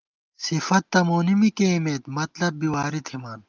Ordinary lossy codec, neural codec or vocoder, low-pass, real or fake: Opus, 24 kbps; none; 7.2 kHz; real